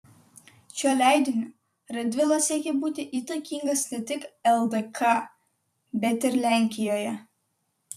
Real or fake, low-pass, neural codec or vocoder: fake; 14.4 kHz; vocoder, 44.1 kHz, 128 mel bands every 256 samples, BigVGAN v2